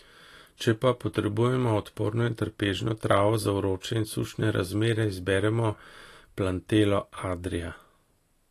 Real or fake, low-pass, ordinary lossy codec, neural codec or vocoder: real; 14.4 kHz; AAC, 48 kbps; none